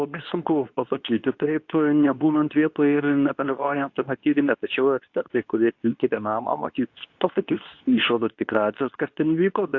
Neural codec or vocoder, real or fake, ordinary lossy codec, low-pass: codec, 24 kHz, 0.9 kbps, WavTokenizer, medium speech release version 2; fake; AAC, 48 kbps; 7.2 kHz